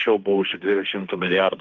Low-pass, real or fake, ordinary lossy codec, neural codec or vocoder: 7.2 kHz; fake; Opus, 24 kbps; codec, 16 kHz, 1.1 kbps, Voila-Tokenizer